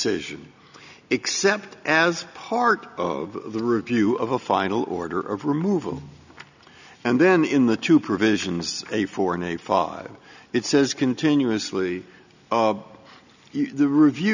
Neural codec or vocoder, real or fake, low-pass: none; real; 7.2 kHz